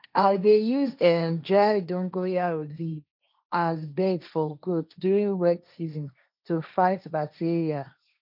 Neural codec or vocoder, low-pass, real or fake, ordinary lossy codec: codec, 16 kHz, 1.1 kbps, Voila-Tokenizer; 5.4 kHz; fake; none